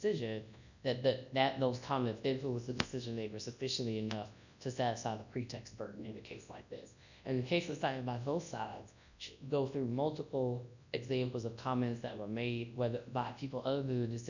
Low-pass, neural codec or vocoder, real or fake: 7.2 kHz; codec, 24 kHz, 0.9 kbps, WavTokenizer, large speech release; fake